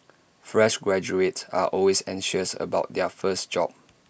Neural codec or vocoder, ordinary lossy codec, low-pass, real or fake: none; none; none; real